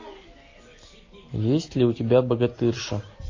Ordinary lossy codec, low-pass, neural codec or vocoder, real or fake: MP3, 32 kbps; 7.2 kHz; none; real